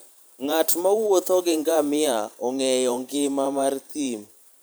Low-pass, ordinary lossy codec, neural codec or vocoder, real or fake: none; none; vocoder, 44.1 kHz, 128 mel bands every 512 samples, BigVGAN v2; fake